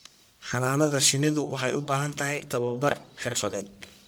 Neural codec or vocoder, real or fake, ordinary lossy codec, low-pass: codec, 44.1 kHz, 1.7 kbps, Pupu-Codec; fake; none; none